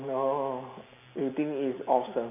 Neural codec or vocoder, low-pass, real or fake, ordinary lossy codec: none; 3.6 kHz; real; none